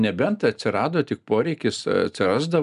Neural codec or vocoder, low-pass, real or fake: none; 10.8 kHz; real